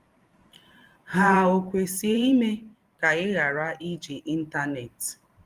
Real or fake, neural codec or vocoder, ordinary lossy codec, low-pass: fake; vocoder, 44.1 kHz, 128 mel bands every 512 samples, BigVGAN v2; Opus, 24 kbps; 14.4 kHz